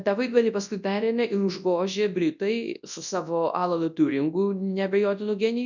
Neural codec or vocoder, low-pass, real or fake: codec, 24 kHz, 0.9 kbps, WavTokenizer, large speech release; 7.2 kHz; fake